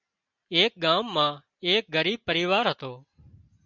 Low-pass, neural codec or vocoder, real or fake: 7.2 kHz; none; real